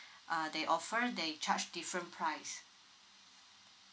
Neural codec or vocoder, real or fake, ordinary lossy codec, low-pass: none; real; none; none